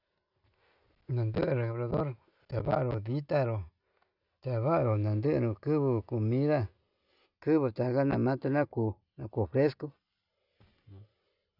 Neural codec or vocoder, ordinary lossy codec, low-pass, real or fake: none; none; 5.4 kHz; real